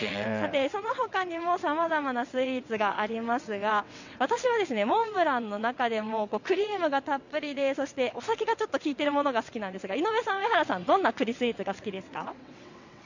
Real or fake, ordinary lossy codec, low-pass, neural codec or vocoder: fake; none; 7.2 kHz; vocoder, 22.05 kHz, 80 mel bands, WaveNeXt